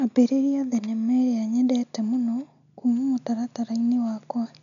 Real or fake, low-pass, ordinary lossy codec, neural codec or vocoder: real; 7.2 kHz; none; none